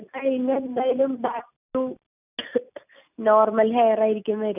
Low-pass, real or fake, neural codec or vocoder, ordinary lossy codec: 3.6 kHz; real; none; none